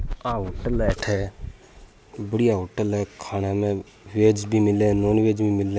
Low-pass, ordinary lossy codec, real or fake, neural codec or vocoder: none; none; real; none